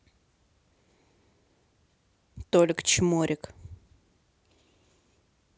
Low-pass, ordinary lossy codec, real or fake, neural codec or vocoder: none; none; real; none